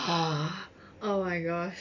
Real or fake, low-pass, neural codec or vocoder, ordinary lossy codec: real; 7.2 kHz; none; AAC, 48 kbps